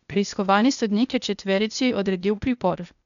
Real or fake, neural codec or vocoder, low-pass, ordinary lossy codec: fake; codec, 16 kHz, 0.8 kbps, ZipCodec; 7.2 kHz; none